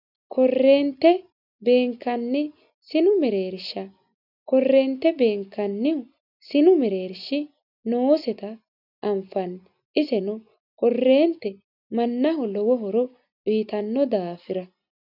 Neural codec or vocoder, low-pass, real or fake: none; 5.4 kHz; real